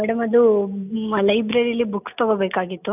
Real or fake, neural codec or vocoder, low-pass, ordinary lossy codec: real; none; 3.6 kHz; none